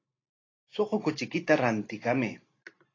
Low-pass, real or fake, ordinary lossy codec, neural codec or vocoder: 7.2 kHz; real; AAC, 32 kbps; none